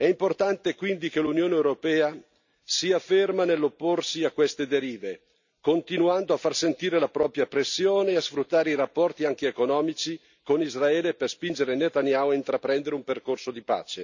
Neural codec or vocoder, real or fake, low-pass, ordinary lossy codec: none; real; 7.2 kHz; none